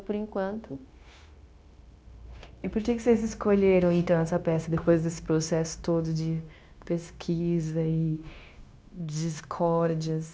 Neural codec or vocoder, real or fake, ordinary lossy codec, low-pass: codec, 16 kHz, 0.9 kbps, LongCat-Audio-Codec; fake; none; none